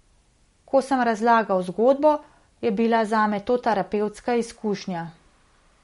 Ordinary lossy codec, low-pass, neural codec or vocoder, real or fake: MP3, 48 kbps; 10.8 kHz; none; real